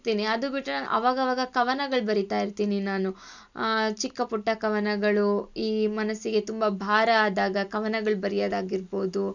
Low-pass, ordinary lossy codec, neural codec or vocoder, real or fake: 7.2 kHz; none; none; real